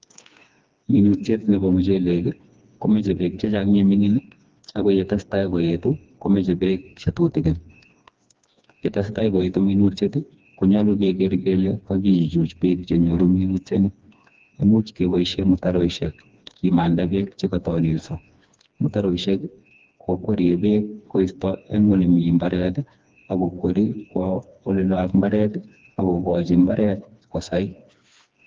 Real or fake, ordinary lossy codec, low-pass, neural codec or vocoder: fake; Opus, 32 kbps; 7.2 kHz; codec, 16 kHz, 2 kbps, FreqCodec, smaller model